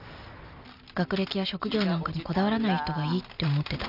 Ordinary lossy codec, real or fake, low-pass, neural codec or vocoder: none; real; 5.4 kHz; none